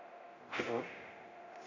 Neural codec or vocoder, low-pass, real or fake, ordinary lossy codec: codec, 24 kHz, 0.9 kbps, DualCodec; 7.2 kHz; fake; AAC, 48 kbps